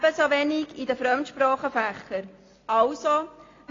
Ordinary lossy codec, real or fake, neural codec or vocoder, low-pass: AAC, 32 kbps; real; none; 7.2 kHz